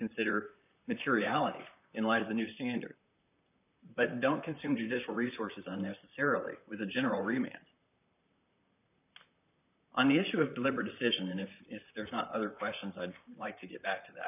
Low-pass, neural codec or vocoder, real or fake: 3.6 kHz; vocoder, 44.1 kHz, 128 mel bands, Pupu-Vocoder; fake